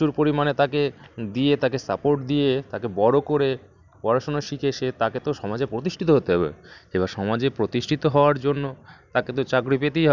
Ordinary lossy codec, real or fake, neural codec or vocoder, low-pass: none; real; none; 7.2 kHz